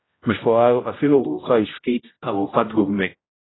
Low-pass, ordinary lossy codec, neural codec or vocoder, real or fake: 7.2 kHz; AAC, 16 kbps; codec, 16 kHz, 0.5 kbps, X-Codec, HuBERT features, trained on general audio; fake